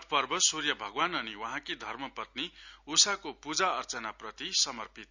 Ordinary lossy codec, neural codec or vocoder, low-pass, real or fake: none; none; 7.2 kHz; real